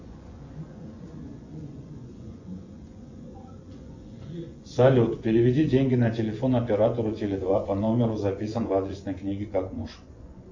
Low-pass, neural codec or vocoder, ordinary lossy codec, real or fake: 7.2 kHz; vocoder, 24 kHz, 100 mel bands, Vocos; AAC, 48 kbps; fake